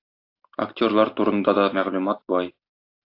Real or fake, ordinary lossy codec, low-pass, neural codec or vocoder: real; AAC, 32 kbps; 5.4 kHz; none